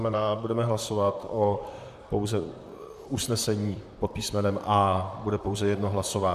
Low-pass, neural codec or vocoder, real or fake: 14.4 kHz; vocoder, 44.1 kHz, 128 mel bands, Pupu-Vocoder; fake